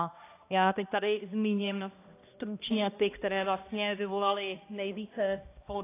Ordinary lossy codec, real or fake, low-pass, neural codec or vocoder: AAC, 24 kbps; fake; 3.6 kHz; codec, 16 kHz, 1 kbps, X-Codec, HuBERT features, trained on balanced general audio